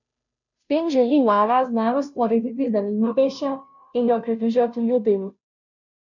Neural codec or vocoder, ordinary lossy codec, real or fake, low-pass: codec, 16 kHz, 0.5 kbps, FunCodec, trained on Chinese and English, 25 frames a second; none; fake; 7.2 kHz